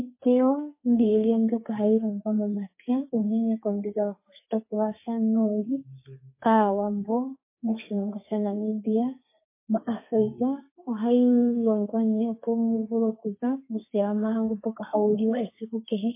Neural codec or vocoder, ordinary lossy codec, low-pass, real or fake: codec, 32 kHz, 1.9 kbps, SNAC; MP3, 24 kbps; 3.6 kHz; fake